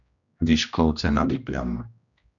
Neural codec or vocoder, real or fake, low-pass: codec, 16 kHz, 1 kbps, X-Codec, HuBERT features, trained on general audio; fake; 7.2 kHz